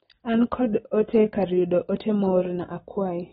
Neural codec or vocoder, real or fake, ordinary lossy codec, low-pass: none; real; AAC, 16 kbps; 19.8 kHz